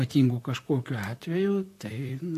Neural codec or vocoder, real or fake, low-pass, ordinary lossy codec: vocoder, 44.1 kHz, 128 mel bands, Pupu-Vocoder; fake; 14.4 kHz; AAC, 64 kbps